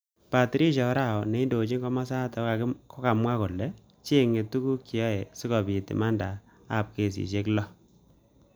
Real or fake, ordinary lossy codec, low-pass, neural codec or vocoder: real; none; none; none